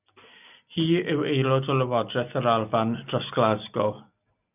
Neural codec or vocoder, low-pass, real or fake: none; 3.6 kHz; real